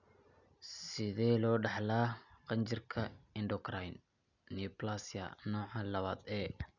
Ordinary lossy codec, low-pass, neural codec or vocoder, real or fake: none; 7.2 kHz; none; real